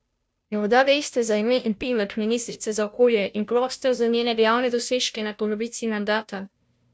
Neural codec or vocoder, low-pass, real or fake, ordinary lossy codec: codec, 16 kHz, 0.5 kbps, FunCodec, trained on Chinese and English, 25 frames a second; none; fake; none